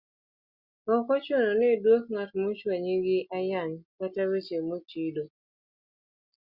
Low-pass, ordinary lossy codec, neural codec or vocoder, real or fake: 5.4 kHz; Opus, 64 kbps; none; real